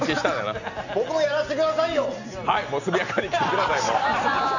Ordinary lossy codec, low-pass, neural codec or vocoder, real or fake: none; 7.2 kHz; none; real